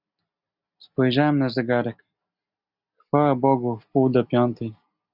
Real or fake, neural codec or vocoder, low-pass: real; none; 5.4 kHz